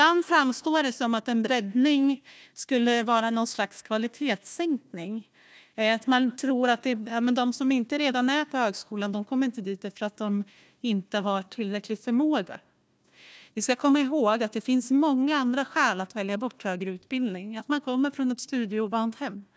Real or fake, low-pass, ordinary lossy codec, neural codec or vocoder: fake; none; none; codec, 16 kHz, 1 kbps, FunCodec, trained on Chinese and English, 50 frames a second